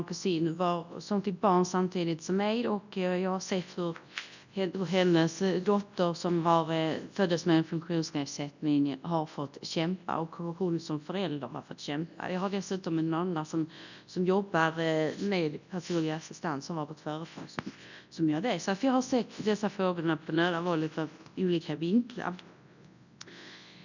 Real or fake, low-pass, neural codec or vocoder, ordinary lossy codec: fake; 7.2 kHz; codec, 24 kHz, 0.9 kbps, WavTokenizer, large speech release; none